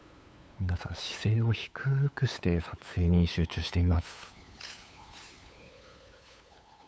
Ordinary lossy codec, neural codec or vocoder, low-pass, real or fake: none; codec, 16 kHz, 8 kbps, FunCodec, trained on LibriTTS, 25 frames a second; none; fake